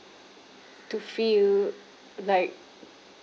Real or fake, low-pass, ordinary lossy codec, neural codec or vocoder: real; none; none; none